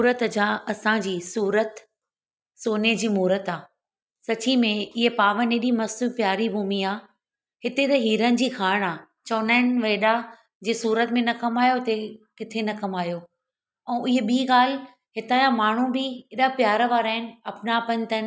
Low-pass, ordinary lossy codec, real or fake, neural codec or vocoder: none; none; real; none